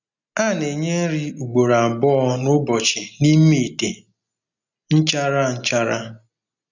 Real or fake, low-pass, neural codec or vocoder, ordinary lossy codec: real; 7.2 kHz; none; none